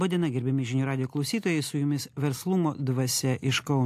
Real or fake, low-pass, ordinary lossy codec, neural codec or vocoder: real; 14.4 kHz; AAC, 64 kbps; none